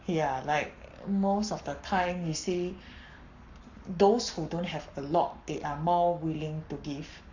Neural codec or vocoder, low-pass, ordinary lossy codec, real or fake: codec, 44.1 kHz, 7.8 kbps, Pupu-Codec; 7.2 kHz; none; fake